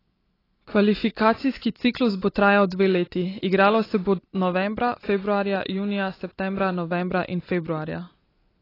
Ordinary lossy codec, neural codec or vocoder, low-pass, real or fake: AAC, 24 kbps; none; 5.4 kHz; real